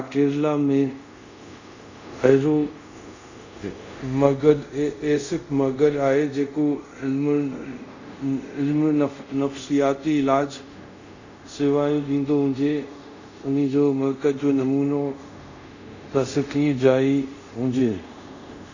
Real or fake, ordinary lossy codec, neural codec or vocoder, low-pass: fake; Opus, 64 kbps; codec, 24 kHz, 0.5 kbps, DualCodec; 7.2 kHz